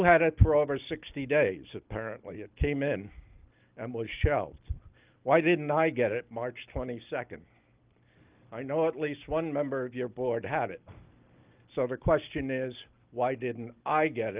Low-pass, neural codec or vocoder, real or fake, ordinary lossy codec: 3.6 kHz; none; real; Opus, 32 kbps